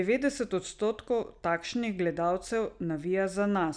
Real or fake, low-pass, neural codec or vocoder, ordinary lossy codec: real; 9.9 kHz; none; none